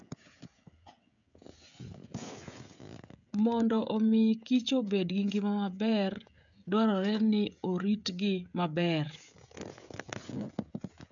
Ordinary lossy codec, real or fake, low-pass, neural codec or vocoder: none; fake; 7.2 kHz; codec, 16 kHz, 16 kbps, FreqCodec, smaller model